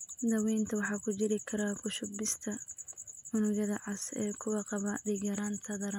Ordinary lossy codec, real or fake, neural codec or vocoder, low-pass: none; real; none; 19.8 kHz